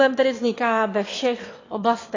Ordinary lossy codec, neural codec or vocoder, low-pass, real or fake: AAC, 32 kbps; autoencoder, 22.05 kHz, a latent of 192 numbers a frame, VITS, trained on one speaker; 7.2 kHz; fake